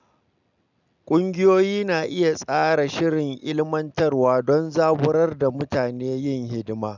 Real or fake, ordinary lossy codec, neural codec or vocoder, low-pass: real; none; none; 7.2 kHz